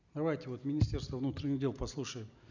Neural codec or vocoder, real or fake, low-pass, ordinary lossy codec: none; real; 7.2 kHz; none